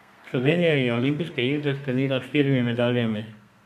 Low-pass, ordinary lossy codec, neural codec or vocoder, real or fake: 14.4 kHz; MP3, 96 kbps; codec, 32 kHz, 1.9 kbps, SNAC; fake